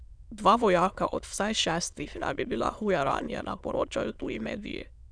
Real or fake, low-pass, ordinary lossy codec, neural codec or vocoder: fake; 9.9 kHz; none; autoencoder, 22.05 kHz, a latent of 192 numbers a frame, VITS, trained on many speakers